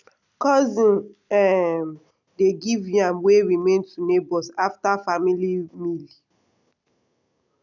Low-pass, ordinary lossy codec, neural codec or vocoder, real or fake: 7.2 kHz; none; none; real